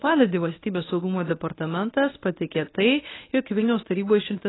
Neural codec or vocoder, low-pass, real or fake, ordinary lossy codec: codec, 24 kHz, 0.9 kbps, WavTokenizer, medium speech release version 1; 7.2 kHz; fake; AAC, 16 kbps